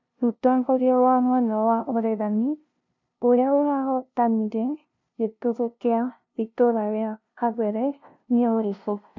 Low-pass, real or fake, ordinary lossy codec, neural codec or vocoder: 7.2 kHz; fake; MP3, 64 kbps; codec, 16 kHz, 0.5 kbps, FunCodec, trained on LibriTTS, 25 frames a second